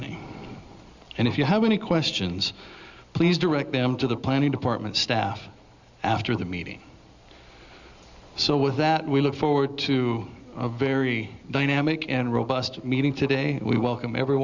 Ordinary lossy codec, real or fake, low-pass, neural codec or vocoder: Opus, 64 kbps; real; 7.2 kHz; none